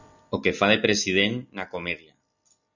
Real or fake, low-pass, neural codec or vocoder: real; 7.2 kHz; none